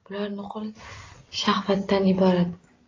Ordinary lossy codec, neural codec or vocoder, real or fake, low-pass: AAC, 32 kbps; none; real; 7.2 kHz